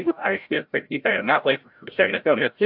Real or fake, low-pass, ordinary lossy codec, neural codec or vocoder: fake; 5.4 kHz; AAC, 48 kbps; codec, 16 kHz, 0.5 kbps, FreqCodec, larger model